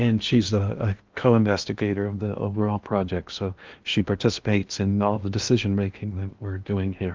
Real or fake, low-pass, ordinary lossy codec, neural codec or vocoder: fake; 7.2 kHz; Opus, 32 kbps; codec, 16 kHz in and 24 kHz out, 0.8 kbps, FocalCodec, streaming, 65536 codes